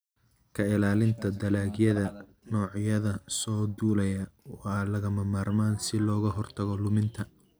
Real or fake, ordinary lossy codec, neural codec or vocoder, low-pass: real; none; none; none